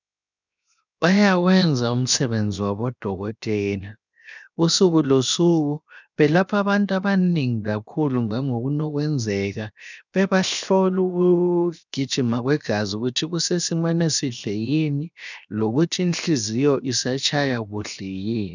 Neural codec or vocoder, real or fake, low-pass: codec, 16 kHz, 0.7 kbps, FocalCodec; fake; 7.2 kHz